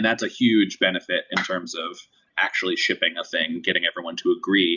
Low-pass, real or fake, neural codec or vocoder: 7.2 kHz; real; none